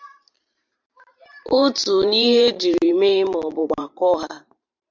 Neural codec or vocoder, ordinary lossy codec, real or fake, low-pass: vocoder, 44.1 kHz, 128 mel bands every 512 samples, BigVGAN v2; MP3, 64 kbps; fake; 7.2 kHz